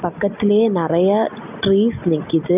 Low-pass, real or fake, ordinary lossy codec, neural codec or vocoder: 3.6 kHz; real; none; none